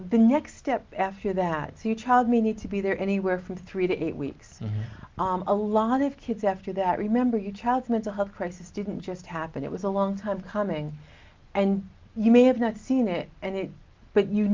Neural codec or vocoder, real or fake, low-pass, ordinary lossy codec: none; real; 7.2 kHz; Opus, 32 kbps